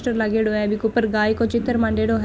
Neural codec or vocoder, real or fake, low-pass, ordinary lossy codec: none; real; none; none